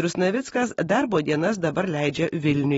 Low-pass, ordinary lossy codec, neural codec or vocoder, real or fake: 19.8 kHz; AAC, 24 kbps; none; real